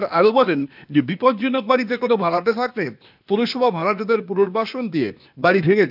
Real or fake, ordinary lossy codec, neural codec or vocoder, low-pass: fake; none; codec, 16 kHz, 0.8 kbps, ZipCodec; 5.4 kHz